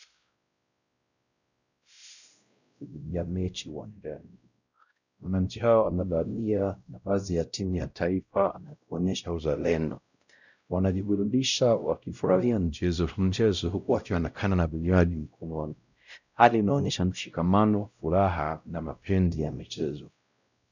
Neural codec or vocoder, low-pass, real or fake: codec, 16 kHz, 0.5 kbps, X-Codec, WavLM features, trained on Multilingual LibriSpeech; 7.2 kHz; fake